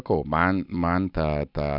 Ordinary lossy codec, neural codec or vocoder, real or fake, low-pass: Opus, 64 kbps; none; real; 5.4 kHz